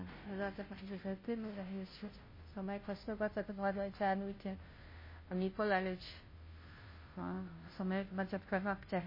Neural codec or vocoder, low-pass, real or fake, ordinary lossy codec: codec, 16 kHz, 0.5 kbps, FunCodec, trained on Chinese and English, 25 frames a second; 5.4 kHz; fake; MP3, 24 kbps